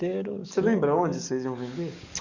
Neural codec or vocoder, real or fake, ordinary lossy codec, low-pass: codec, 44.1 kHz, 7.8 kbps, DAC; fake; none; 7.2 kHz